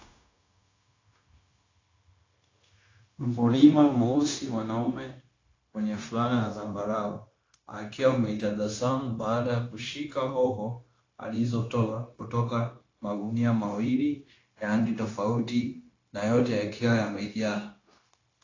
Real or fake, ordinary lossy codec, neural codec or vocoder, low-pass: fake; AAC, 32 kbps; codec, 16 kHz, 0.9 kbps, LongCat-Audio-Codec; 7.2 kHz